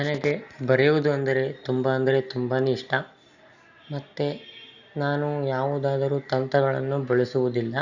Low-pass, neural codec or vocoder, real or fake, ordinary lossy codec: 7.2 kHz; none; real; Opus, 64 kbps